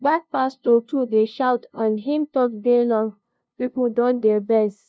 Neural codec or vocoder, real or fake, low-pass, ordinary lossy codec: codec, 16 kHz, 0.5 kbps, FunCodec, trained on LibriTTS, 25 frames a second; fake; none; none